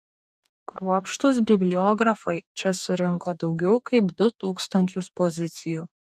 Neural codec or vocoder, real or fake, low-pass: codec, 44.1 kHz, 2.6 kbps, DAC; fake; 14.4 kHz